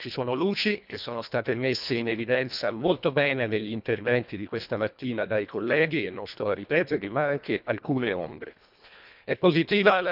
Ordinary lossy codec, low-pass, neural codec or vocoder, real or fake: none; 5.4 kHz; codec, 24 kHz, 1.5 kbps, HILCodec; fake